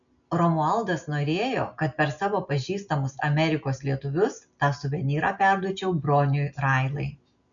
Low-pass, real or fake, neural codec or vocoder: 7.2 kHz; real; none